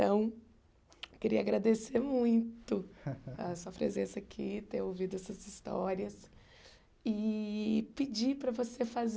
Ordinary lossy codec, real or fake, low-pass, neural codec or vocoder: none; real; none; none